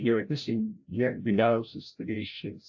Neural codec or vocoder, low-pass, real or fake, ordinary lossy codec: codec, 16 kHz, 0.5 kbps, FreqCodec, larger model; 7.2 kHz; fake; AAC, 48 kbps